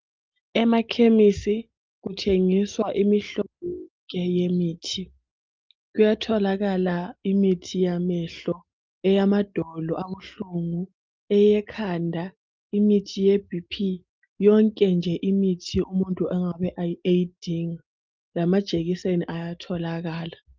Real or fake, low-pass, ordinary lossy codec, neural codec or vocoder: real; 7.2 kHz; Opus, 32 kbps; none